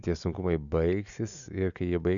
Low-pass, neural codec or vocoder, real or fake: 7.2 kHz; none; real